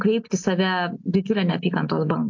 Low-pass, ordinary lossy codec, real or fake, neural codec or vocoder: 7.2 kHz; AAC, 48 kbps; real; none